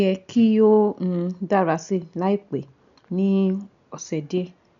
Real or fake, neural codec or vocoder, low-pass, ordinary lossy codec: real; none; 7.2 kHz; none